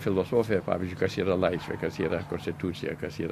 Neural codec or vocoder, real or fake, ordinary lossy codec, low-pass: none; real; MP3, 64 kbps; 14.4 kHz